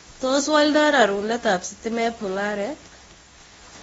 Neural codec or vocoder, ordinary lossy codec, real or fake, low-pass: codec, 24 kHz, 0.9 kbps, WavTokenizer, medium speech release version 2; AAC, 24 kbps; fake; 10.8 kHz